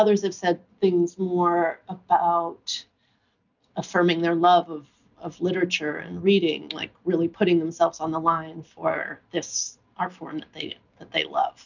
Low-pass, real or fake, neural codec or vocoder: 7.2 kHz; real; none